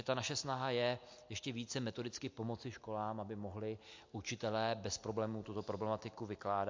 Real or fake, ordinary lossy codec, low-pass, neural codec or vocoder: real; MP3, 48 kbps; 7.2 kHz; none